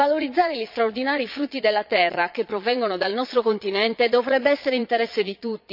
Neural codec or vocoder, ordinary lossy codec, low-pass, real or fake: vocoder, 22.05 kHz, 80 mel bands, Vocos; none; 5.4 kHz; fake